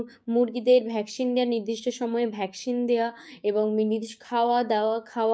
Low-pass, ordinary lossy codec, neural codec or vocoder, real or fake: none; none; codec, 16 kHz, 6 kbps, DAC; fake